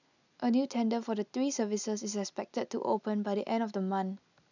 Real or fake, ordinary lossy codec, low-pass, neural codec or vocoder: real; none; 7.2 kHz; none